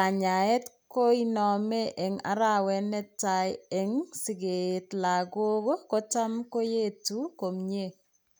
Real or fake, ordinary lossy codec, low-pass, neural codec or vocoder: real; none; none; none